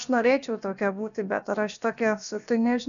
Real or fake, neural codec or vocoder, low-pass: fake; codec, 16 kHz, about 1 kbps, DyCAST, with the encoder's durations; 7.2 kHz